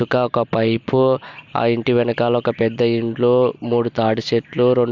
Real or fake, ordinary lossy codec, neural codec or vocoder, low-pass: real; MP3, 64 kbps; none; 7.2 kHz